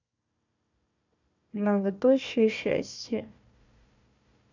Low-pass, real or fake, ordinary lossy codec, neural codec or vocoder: 7.2 kHz; fake; AAC, 48 kbps; codec, 16 kHz, 1 kbps, FunCodec, trained on Chinese and English, 50 frames a second